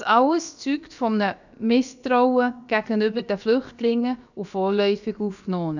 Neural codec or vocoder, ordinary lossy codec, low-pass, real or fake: codec, 16 kHz, about 1 kbps, DyCAST, with the encoder's durations; none; 7.2 kHz; fake